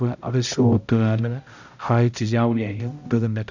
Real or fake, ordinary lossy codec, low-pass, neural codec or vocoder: fake; none; 7.2 kHz; codec, 16 kHz, 0.5 kbps, X-Codec, HuBERT features, trained on balanced general audio